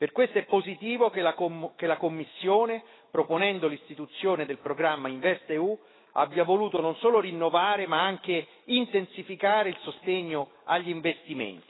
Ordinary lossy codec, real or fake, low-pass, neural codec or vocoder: AAC, 16 kbps; fake; 7.2 kHz; autoencoder, 48 kHz, 128 numbers a frame, DAC-VAE, trained on Japanese speech